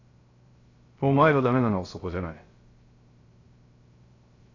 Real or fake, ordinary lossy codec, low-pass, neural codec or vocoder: fake; AAC, 32 kbps; 7.2 kHz; codec, 16 kHz, 0.3 kbps, FocalCodec